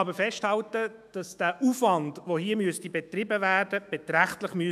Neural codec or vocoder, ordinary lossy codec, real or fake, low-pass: autoencoder, 48 kHz, 128 numbers a frame, DAC-VAE, trained on Japanese speech; none; fake; 14.4 kHz